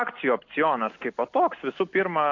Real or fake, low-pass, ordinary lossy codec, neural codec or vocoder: real; 7.2 kHz; AAC, 48 kbps; none